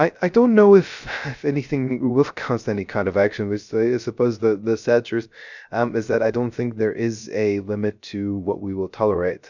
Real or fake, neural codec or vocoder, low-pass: fake; codec, 16 kHz, 0.3 kbps, FocalCodec; 7.2 kHz